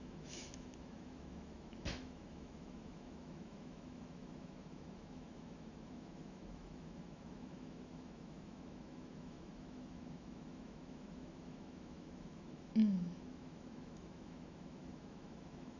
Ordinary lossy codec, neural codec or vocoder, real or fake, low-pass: none; autoencoder, 48 kHz, 128 numbers a frame, DAC-VAE, trained on Japanese speech; fake; 7.2 kHz